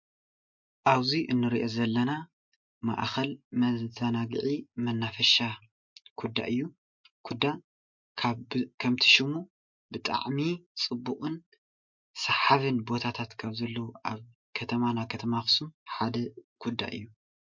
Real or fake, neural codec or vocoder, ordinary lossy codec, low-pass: real; none; MP3, 48 kbps; 7.2 kHz